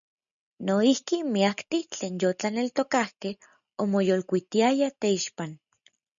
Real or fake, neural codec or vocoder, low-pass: real; none; 7.2 kHz